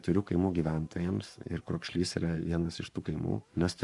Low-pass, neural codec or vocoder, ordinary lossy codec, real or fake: 10.8 kHz; codec, 44.1 kHz, 7.8 kbps, Pupu-Codec; Opus, 64 kbps; fake